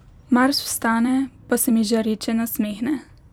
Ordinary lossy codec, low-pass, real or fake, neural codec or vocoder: none; 19.8 kHz; fake; vocoder, 44.1 kHz, 128 mel bands every 256 samples, BigVGAN v2